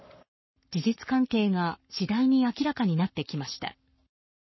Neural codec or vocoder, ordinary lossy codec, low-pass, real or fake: codec, 44.1 kHz, 7.8 kbps, Pupu-Codec; MP3, 24 kbps; 7.2 kHz; fake